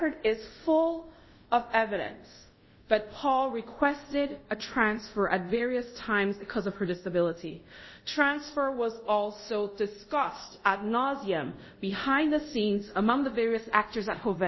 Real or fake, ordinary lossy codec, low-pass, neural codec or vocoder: fake; MP3, 24 kbps; 7.2 kHz; codec, 24 kHz, 0.5 kbps, DualCodec